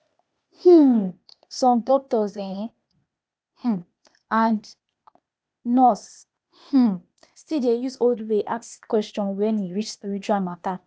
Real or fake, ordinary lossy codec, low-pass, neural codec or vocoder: fake; none; none; codec, 16 kHz, 0.8 kbps, ZipCodec